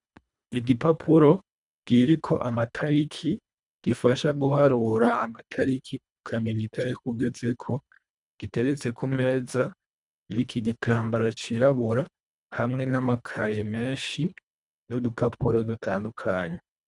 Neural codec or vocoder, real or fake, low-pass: codec, 24 kHz, 1.5 kbps, HILCodec; fake; 10.8 kHz